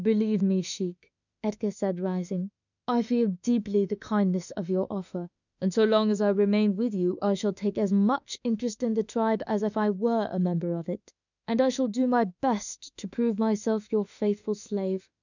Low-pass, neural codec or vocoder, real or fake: 7.2 kHz; autoencoder, 48 kHz, 32 numbers a frame, DAC-VAE, trained on Japanese speech; fake